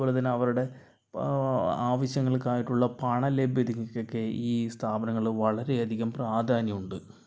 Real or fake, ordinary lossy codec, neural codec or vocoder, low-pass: real; none; none; none